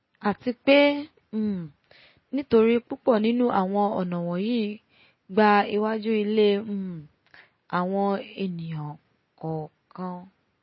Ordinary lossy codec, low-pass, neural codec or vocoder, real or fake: MP3, 24 kbps; 7.2 kHz; none; real